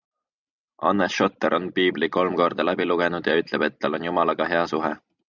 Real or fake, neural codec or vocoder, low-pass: real; none; 7.2 kHz